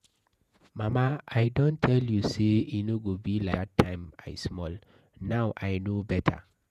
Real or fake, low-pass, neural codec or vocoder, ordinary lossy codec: fake; 14.4 kHz; vocoder, 48 kHz, 128 mel bands, Vocos; none